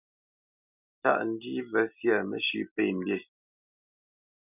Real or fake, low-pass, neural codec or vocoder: real; 3.6 kHz; none